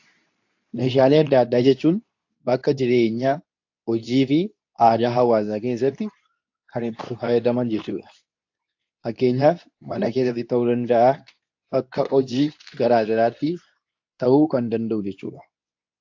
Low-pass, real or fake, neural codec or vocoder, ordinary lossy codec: 7.2 kHz; fake; codec, 24 kHz, 0.9 kbps, WavTokenizer, medium speech release version 2; AAC, 48 kbps